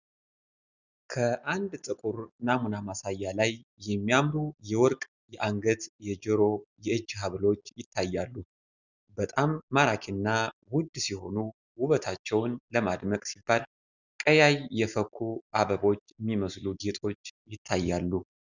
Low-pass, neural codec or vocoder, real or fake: 7.2 kHz; none; real